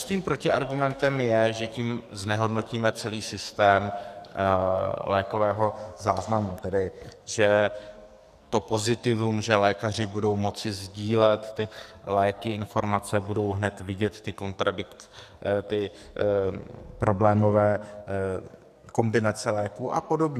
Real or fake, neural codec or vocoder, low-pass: fake; codec, 44.1 kHz, 2.6 kbps, SNAC; 14.4 kHz